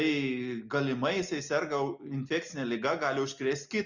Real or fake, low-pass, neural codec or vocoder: real; 7.2 kHz; none